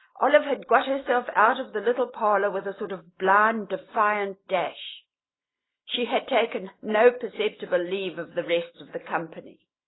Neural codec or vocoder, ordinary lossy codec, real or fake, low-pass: none; AAC, 16 kbps; real; 7.2 kHz